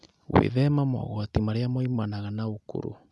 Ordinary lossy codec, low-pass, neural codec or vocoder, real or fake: none; none; none; real